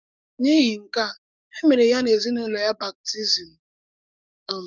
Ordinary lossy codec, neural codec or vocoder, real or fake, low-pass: none; codec, 44.1 kHz, 7.8 kbps, DAC; fake; 7.2 kHz